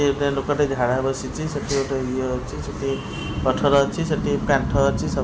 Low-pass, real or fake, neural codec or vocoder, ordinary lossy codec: none; real; none; none